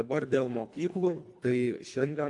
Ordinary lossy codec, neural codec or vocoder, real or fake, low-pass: AAC, 64 kbps; codec, 24 kHz, 1.5 kbps, HILCodec; fake; 10.8 kHz